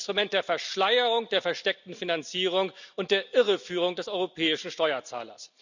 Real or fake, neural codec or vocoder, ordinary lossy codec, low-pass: real; none; none; 7.2 kHz